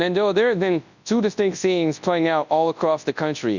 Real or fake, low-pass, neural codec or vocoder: fake; 7.2 kHz; codec, 24 kHz, 0.9 kbps, WavTokenizer, large speech release